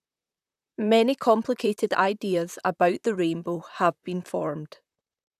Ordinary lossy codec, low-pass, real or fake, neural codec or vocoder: none; 14.4 kHz; fake; vocoder, 44.1 kHz, 128 mel bands, Pupu-Vocoder